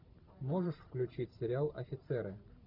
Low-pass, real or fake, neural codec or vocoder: 5.4 kHz; fake; vocoder, 44.1 kHz, 128 mel bands every 512 samples, BigVGAN v2